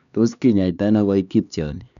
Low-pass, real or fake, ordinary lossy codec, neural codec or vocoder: 7.2 kHz; fake; none; codec, 16 kHz, 2 kbps, X-Codec, HuBERT features, trained on LibriSpeech